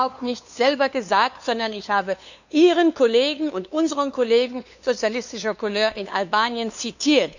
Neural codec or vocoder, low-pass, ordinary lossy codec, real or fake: codec, 16 kHz, 2 kbps, FunCodec, trained on LibriTTS, 25 frames a second; 7.2 kHz; none; fake